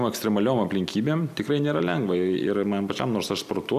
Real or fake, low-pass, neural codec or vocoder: fake; 14.4 kHz; vocoder, 44.1 kHz, 128 mel bands every 256 samples, BigVGAN v2